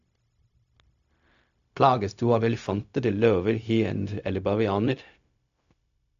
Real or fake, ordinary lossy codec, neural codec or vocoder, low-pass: fake; AAC, 96 kbps; codec, 16 kHz, 0.4 kbps, LongCat-Audio-Codec; 7.2 kHz